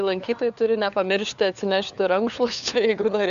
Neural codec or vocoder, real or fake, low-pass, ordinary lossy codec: codec, 16 kHz, 4 kbps, FunCodec, trained on LibriTTS, 50 frames a second; fake; 7.2 kHz; MP3, 96 kbps